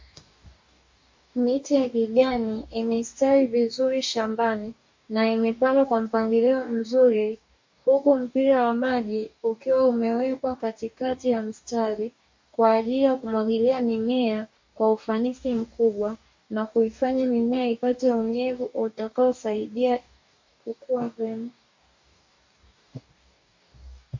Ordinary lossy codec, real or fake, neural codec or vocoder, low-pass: MP3, 48 kbps; fake; codec, 44.1 kHz, 2.6 kbps, DAC; 7.2 kHz